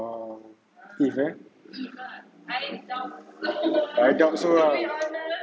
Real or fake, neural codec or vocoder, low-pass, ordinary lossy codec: real; none; none; none